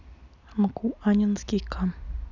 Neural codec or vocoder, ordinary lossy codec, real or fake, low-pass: none; none; real; 7.2 kHz